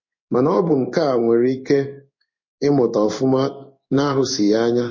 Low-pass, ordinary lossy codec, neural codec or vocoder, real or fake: 7.2 kHz; MP3, 32 kbps; codec, 16 kHz in and 24 kHz out, 1 kbps, XY-Tokenizer; fake